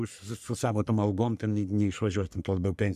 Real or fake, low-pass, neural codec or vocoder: fake; 14.4 kHz; codec, 44.1 kHz, 3.4 kbps, Pupu-Codec